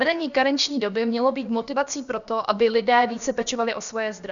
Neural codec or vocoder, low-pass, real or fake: codec, 16 kHz, about 1 kbps, DyCAST, with the encoder's durations; 7.2 kHz; fake